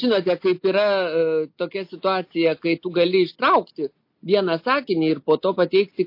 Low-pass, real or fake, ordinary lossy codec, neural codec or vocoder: 5.4 kHz; real; MP3, 32 kbps; none